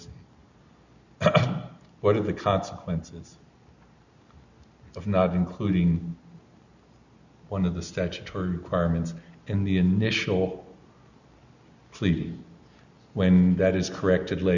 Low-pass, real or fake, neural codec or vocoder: 7.2 kHz; real; none